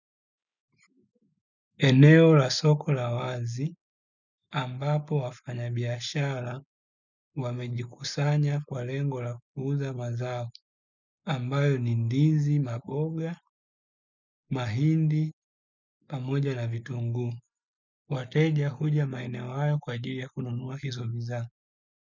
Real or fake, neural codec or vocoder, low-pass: real; none; 7.2 kHz